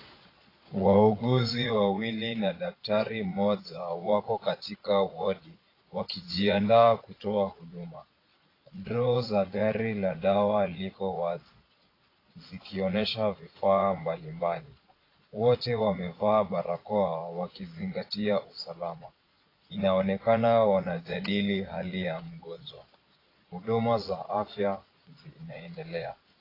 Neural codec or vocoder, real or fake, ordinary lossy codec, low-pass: vocoder, 22.05 kHz, 80 mel bands, Vocos; fake; AAC, 24 kbps; 5.4 kHz